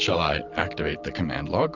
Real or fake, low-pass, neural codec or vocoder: real; 7.2 kHz; none